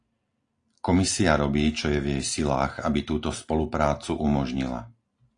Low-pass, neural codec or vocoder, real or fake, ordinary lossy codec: 9.9 kHz; none; real; AAC, 48 kbps